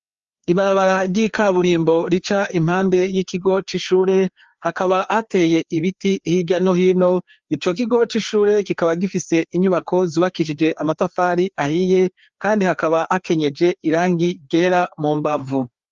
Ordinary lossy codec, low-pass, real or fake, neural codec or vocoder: Opus, 32 kbps; 7.2 kHz; fake; codec, 16 kHz, 2 kbps, FreqCodec, larger model